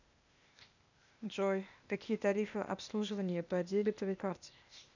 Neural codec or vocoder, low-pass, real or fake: codec, 16 kHz, 0.8 kbps, ZipCodec; 7.2 kHz; fake